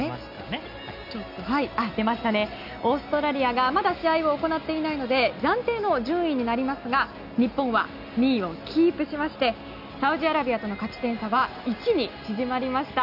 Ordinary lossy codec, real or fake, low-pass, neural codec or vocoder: none; real; 5.4 kHz; none